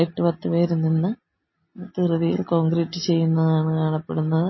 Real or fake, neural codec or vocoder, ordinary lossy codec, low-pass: real; none; MP3, 24 kbps; 7.2 kHz